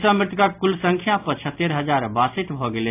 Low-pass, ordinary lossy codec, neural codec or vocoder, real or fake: 3.6 kHz; AAC, 32 kbps; none; real